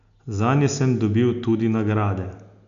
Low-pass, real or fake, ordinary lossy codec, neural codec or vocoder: 7.2 kHz; real; none; none